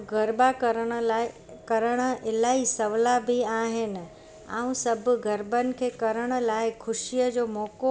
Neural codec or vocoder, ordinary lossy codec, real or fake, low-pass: none; none; real; none